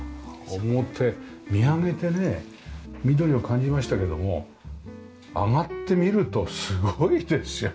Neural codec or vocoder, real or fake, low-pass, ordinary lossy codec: none; real; none; none